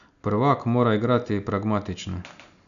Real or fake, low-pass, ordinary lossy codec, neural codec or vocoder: real; 7.2 kHz; none; none